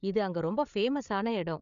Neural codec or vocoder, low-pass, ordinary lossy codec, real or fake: codec, 16 kHz, 8 kbps, FreqCodec, larger model; 7.2 kHz; none; fake